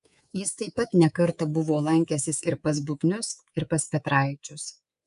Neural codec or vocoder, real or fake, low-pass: codec, 24 kHz, 3.1 kbps, DualCodec; fake; 10.8 kHz